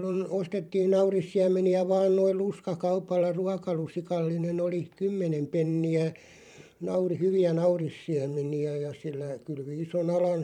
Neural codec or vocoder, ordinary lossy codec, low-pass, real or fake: vocoder, 44.1 kHz, 128 mel bands every 512 samples, BigVGAN v2; none; 19.8 kHz; fake